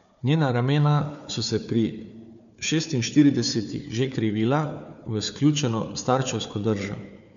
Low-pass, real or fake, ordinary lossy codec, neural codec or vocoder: 7.2 kHz; fake; MP3, 96 kbps; codec, 16 kHz, 4 kbps, FunCodec, trained on Chinese and English, 50 frames a second